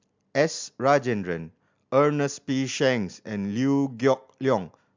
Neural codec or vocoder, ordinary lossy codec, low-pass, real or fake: none; MP3, 64 kbps; 7.2 kHz; real